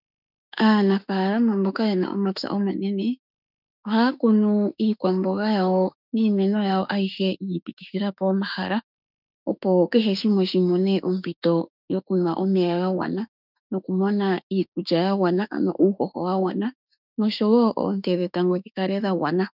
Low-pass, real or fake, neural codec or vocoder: 5.4 kHz; fake; autoencoder, 48 kHz, 32 numbers a frame, DAC-VAE, trained on Japanese speech